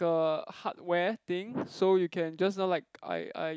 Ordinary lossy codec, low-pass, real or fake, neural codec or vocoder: none; none; real; none